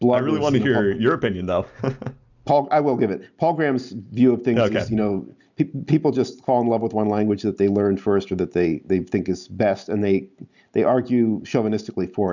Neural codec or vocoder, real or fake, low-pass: none; real; 7.2 kHz